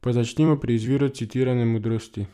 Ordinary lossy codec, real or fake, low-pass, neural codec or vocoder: none; fake; 14.4 kHz; vocoder, 44.1 kHz, 128 mel bands every 256 samples, BigVGAN v2